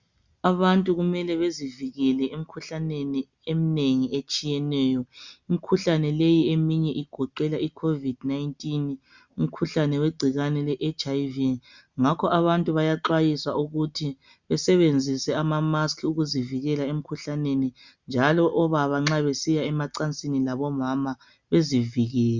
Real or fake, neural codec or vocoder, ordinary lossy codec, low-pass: real; none; Opus, 64 kbps; 7.2 kHz